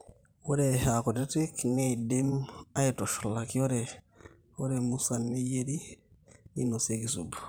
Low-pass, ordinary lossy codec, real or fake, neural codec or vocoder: none; none; fake; vocoder, 44.1 kHz, 128 mel bands every 512 samples, BigVGAN v2